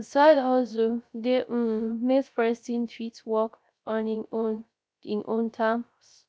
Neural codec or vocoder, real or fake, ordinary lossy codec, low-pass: codec, 16 kHz, 0.3 kbps, FocalCodec; fake; none; none